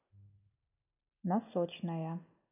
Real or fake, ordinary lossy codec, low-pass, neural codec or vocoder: real; none; 3.6 kHz; none